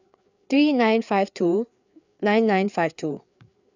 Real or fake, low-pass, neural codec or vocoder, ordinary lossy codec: fake; 7.2 kHz; codec, 16 kHz, 4 kbps, FreqCodec, larger model; none